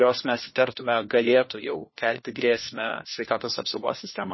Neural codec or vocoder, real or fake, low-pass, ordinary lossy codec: codec, 16 kHz, 1 kbps, FunCodec, trained on LibriTTS, 50 frames a second; fake; 7.2 kHz; MP3, 24 kbps